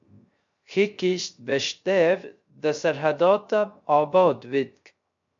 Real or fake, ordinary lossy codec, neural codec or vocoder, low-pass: fake; MP3, 48 kbps; codec, 16 kHz, 0.3 kbps, FocalCodec; 7.2 kHz